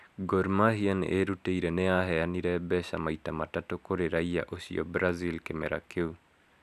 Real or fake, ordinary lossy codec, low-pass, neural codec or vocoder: real; none; 14.4 kHz; none